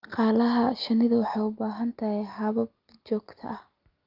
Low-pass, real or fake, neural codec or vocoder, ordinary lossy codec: 5.4 kHz; real; none; Opus, 24 kbps